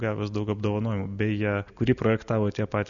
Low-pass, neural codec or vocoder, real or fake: 7.2 kHz; none; real